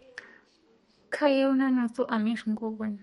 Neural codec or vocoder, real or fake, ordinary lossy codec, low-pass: codec, 32 kHz, 1.9 kbps, SNAC; fake; MP3, 48 kbps; 14.4 kHz